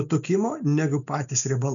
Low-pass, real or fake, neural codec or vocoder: 7.2 kHz; real; none